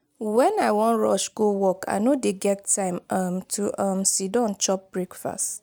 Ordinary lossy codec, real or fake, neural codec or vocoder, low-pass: none; real; none; none